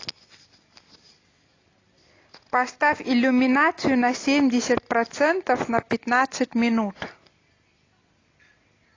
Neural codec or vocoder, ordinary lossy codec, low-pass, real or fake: none; AAC, 32 kbps; 7.2 kHz; real